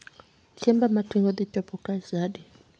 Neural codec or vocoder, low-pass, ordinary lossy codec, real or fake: none; 9.9 kHz; AAC, 64 kbps; real